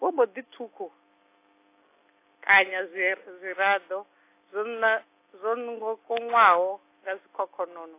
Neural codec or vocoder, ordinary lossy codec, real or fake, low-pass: none; AAC, 24 kbps; real; 3.6 kHz